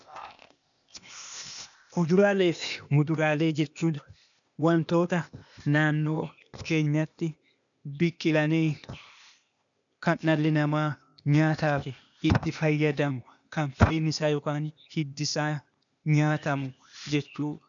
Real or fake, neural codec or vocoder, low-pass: fake; codec, 16 kHz, 0.8 kbps, ZipCodec; 7.2 kHz